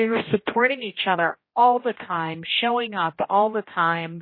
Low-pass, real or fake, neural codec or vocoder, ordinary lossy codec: 5.4 kHz; fake; codec, 16 kHz, 1 kbps, X-Codec, HuBERT features, trained on general audio; MP3, 24 kbps